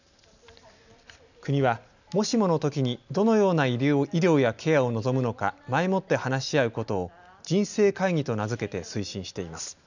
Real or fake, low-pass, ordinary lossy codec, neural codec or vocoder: real; 7.2 kHz; none; none